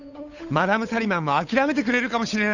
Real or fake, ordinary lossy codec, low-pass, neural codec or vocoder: fake; none; 7.2 kHz; codec, 16 kHz, 8 kbps, FunCodec, trained on Chinese and English, 25 frames a second